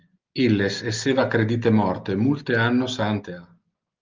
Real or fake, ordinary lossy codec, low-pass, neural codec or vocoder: real; Opus, 32 kbps; 7.2 kHz; none